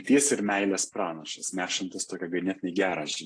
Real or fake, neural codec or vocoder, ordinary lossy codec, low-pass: real; none; AAC, 48 kbps; 9.9 kHz